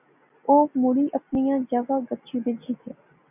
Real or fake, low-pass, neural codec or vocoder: real; 3.6 kHz; none